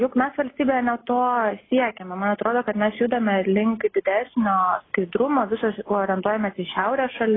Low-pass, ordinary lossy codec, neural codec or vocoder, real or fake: 7.2 kHz; AAC, 16 kbps; none; real